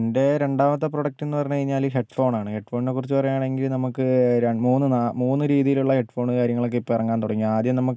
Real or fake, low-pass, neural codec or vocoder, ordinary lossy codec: real; none; none; none